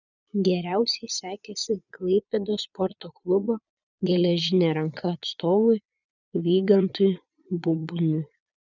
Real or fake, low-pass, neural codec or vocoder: fake; 7.2 kHz; vocoder, 22.05 kHz, 80 mel bands, Vocos